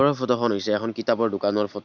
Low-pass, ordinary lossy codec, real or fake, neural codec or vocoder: 7.2 kHz; none; real; none